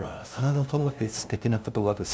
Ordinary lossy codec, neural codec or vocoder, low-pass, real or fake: none; codec, 16 kHz, 0.5 kbps, FunCodec, trained on LibriTTS, 25 frames a second; none; fake